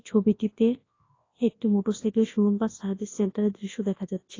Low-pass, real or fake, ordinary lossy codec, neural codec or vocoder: 7.2 kHz; fake; AAC, 32 kbps; codec, 24 kHz, 0.9 kbps, WavTokenizer, large speech release